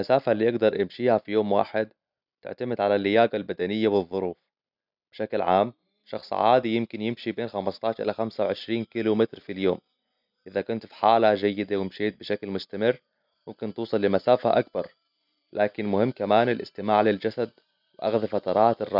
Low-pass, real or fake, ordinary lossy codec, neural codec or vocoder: 5.4 kHz; real; none; none